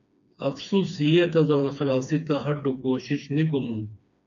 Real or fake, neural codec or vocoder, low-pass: fake; codec, 16 kHz, 2 kbps, FreqCodec, smaller model; 7.2 kHz